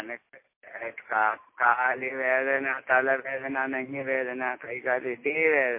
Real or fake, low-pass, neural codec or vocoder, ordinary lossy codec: real; 3.6 kHz; none; MP3, 24 kbps